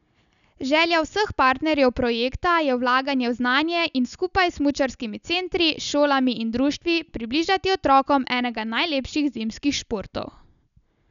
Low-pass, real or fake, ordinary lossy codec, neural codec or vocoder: 7.2 kHz; real; none; none